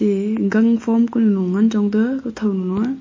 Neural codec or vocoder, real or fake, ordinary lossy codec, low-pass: none; real; MP3, 32 kbps; 7.2 kHz